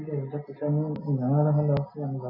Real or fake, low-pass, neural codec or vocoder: real; 5.4 kHz; none